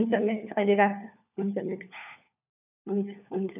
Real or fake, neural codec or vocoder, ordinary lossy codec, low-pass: fake; codec, 16 kHz, 4 kbps, FunCodec, trained on LibriTTS, 50 frames a second; none; 3.6 kHz